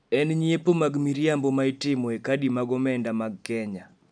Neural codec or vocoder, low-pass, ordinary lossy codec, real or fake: none; 9.9 kHz; none; real